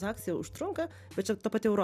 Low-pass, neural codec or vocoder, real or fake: 14.4 kHz; none; real